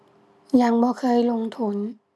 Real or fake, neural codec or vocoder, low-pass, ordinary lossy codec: real; none; none; none